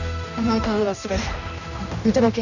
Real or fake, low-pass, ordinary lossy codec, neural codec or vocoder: fake; 7.2 kHz; none; codec, 16 kHz, 1 kbps, X-Codec, HuBERT features, trained on general audio